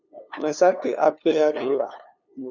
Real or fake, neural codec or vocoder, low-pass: fake; codec, 16 kHz, 2 kbps, FunCodec, trained on LibriTTS, 25 frames a second; 7.2 kHz